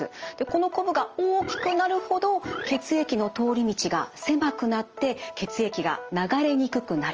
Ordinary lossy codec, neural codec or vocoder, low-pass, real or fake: Opus, 24 kbps; none; 7.2 kHz; real